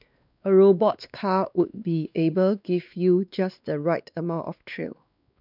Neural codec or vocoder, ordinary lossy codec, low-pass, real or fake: codec, 16 kHz, 2 kbps, X-Codec, WavLM features, trained on Multilingual LibriSpeech; none; 5.4 kHz; fake